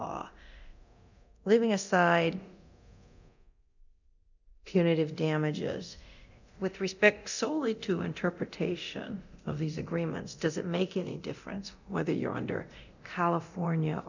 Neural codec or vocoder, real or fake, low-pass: codec, 24 kHz, 0.9 kbps, DualCodec; fake; 7.2 kHz